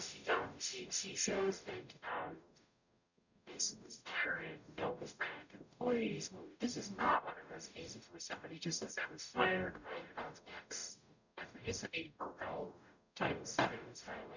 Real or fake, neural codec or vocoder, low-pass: fake; codec, 44.1 kHz, 0.9 kbps, DAC; 7.2 kHz